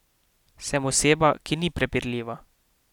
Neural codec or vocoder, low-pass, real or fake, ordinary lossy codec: none; 19.8 kHz; real; none